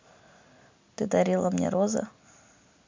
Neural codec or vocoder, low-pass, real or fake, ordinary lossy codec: none; 7.2 kHz; real; MP3, 64 kbps